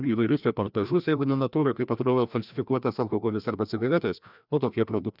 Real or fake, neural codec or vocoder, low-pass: fake; codec, 16 kHz, 1 kbps, FreqCodec, larger model; 5.4 kHz